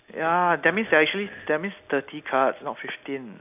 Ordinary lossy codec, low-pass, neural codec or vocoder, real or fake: none; 3.6 kHz; none; real